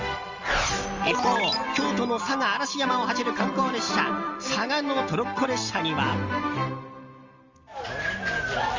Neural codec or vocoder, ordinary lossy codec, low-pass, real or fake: none; Opus, 32 kbps; 7.2 kHz; real